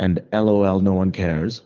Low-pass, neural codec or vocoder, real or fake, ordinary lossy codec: 7.2 kHz; codec, 24 kHz, 6 kbps, HILCodec; fake; Opus, 32 kbps